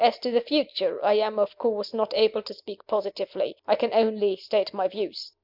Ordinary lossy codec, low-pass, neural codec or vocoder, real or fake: MP3, 48 kbps; 5.4 kHz; none; real